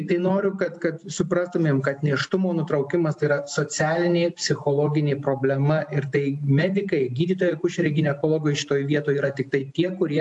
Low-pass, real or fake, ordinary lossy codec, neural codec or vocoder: 10.8 kHz; real; AAC, 64 kbps; none